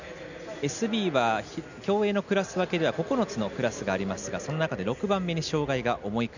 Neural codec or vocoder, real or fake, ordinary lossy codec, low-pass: none; real; none; 7.2 kHz